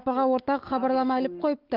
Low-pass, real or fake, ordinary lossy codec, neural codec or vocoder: 5.4 kHz; fake; Opus, 32 kbps; autoencoder, 48 kHz, 128 numbers a frame, DAC-VAE, trained on Japanese speech